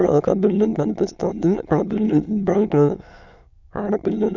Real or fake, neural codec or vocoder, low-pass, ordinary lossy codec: fake; autoencoder, 22.05 kHz, a latent of 192 numbers a frame, VITS, trained on many speakers; 7.2 kHz; none